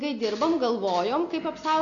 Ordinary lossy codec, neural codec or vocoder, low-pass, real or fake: AAC, 48 kbps; none; 7.2 kHz; real